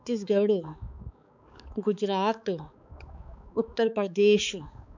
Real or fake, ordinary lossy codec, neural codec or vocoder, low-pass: fake; none; codec, 16 kHz, 4 kbps, X-Codec, HuBERT features, trained on balanced general audio; 7.2 kHz